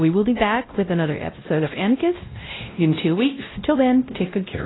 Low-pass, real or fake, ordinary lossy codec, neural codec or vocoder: 7.2 kHz; fake; AAC, 16 kbps; codec, 16 kHz, 0.5 kbps, X-Codec, WavLM features, trained on Multilingual LibriSpeech